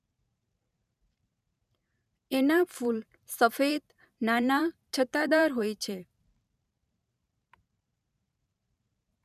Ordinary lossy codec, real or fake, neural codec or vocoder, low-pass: none; fake; vocoder, 44.1 kHz, 128 mel bands every 512 samples, BigVGAN v2; 14.4 kHz